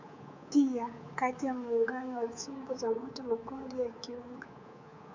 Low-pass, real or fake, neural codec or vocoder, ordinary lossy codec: 7.2 kHz; fake; codec, 24 kHz, 3.1 kbps, DualCodec; MP3, 64 kbps